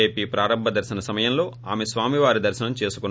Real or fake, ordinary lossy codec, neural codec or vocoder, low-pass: real; none; none; none